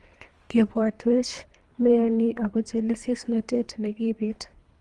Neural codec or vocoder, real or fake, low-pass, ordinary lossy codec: codec, 24 kHz, 3 kbps, HILCodec; fake; 10.8 kHz; Opus, 24 kbps